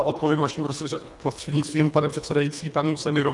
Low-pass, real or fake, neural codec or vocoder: 10.8 kHz; fake; codec, 24 kHz, 1.5 kbps, HILCodec